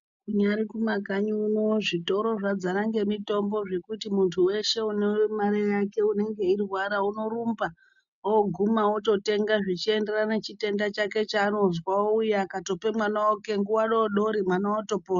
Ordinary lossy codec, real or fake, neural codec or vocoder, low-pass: MP3, 96 kbps; real; none; 7.2 kHz